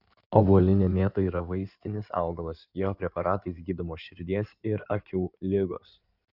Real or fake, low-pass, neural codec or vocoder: fake; 5.4 kHz; codec, 16 kHz in and 24 kHz out, 2.2 kbps, FireRedTTS-2 codec